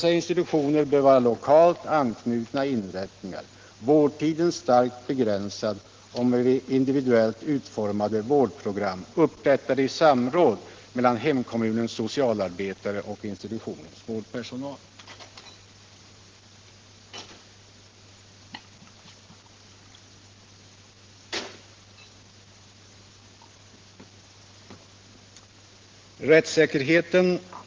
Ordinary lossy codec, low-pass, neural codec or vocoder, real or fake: Opus, 16 kbps; 7.2 kHz; none; real